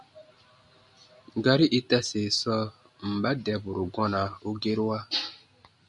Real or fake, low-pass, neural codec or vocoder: real; 10.8 kHz; none